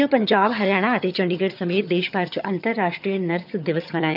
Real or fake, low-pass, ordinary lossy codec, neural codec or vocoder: fake; 5.4 kHz; none; vocoder, 22.05 kHz, 80 mel bands, HiFi-GAN